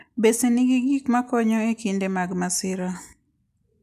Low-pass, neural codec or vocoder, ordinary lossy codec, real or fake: 14.4 kHz; none; none; real